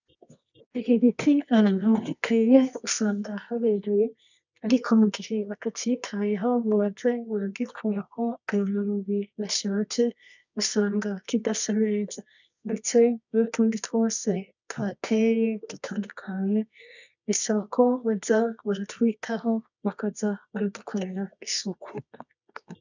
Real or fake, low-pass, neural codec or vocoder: fake; 7.2 kHz; codec, 24 kHz, 0.9 kbps, WavTokenizer, medium music audio release